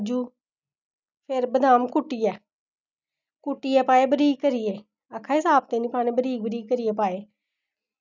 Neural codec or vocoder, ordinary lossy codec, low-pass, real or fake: none; none; 7.2 kHz; real